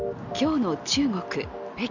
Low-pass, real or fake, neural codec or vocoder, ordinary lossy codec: 7.2 kHz; real; none; none